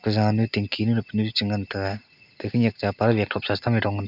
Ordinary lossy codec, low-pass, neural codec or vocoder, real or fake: none; 5.4 kHz; none; real